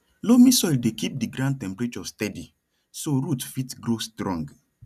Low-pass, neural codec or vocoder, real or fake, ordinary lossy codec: 14.4 kHz; none; real; none